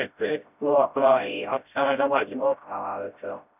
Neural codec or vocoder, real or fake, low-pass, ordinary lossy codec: codec, 16 kHz, 0.5 kbps, FreqCodec, smaller model; fake; 3.6 kHz; none